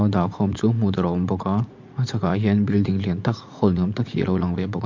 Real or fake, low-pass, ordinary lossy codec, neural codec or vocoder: fake; 7.2 kHz; MP3, 48 kbps; vocoder, 44.1 kHz, 80 mel bands, Vocos